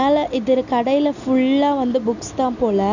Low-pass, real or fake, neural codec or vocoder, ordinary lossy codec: 7.2 kHz; real; none; none